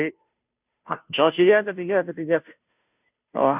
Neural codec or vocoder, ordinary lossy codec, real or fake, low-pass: codec, 16 kHz, 0.5 kbps, FunCodec, trained on Chinese and English, 25 frames a second; none; fake; 3.6 kHz